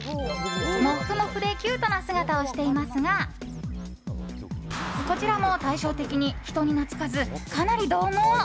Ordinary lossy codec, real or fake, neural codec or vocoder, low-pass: none; real; none; none